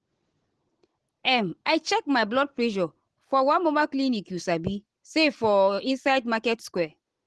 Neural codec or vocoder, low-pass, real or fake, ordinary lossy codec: none; 10.8 kHz; real; Opus, 16 kbps